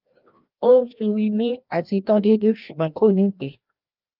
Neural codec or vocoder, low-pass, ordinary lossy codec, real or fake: codec, 16 kHz, 1 kbps, FreqCodec, larger model; 5.4 kHz; Opus, 24 kbps; fake